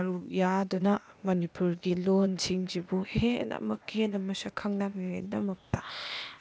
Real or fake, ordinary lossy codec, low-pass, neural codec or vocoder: fake; none; none; codec, 16 kHz, 0.8 kbps, ZipCodec